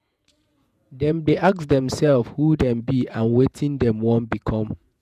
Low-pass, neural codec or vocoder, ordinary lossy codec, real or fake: 14.4 kHz; vocoder, 48 kHz, 128 mel bands, Vocos; none; fake